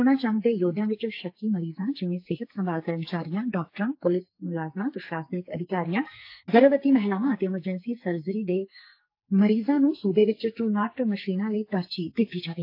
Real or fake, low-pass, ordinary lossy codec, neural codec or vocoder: fake; 5.4 kHz; AAC, 32 kbps; codec, 44.1 kHz, 2.6 kbps, SNAC